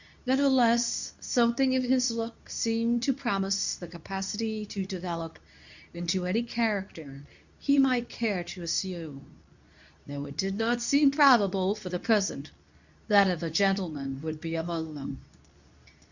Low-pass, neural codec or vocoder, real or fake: 7.2 kHz; codec, 24 kHz, 0.9 kbps, WavTokenizer, medium speech release version 2; fake